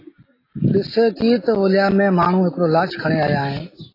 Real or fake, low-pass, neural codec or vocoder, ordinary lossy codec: real; 5.4 kHz; none; AAC, 24 kbps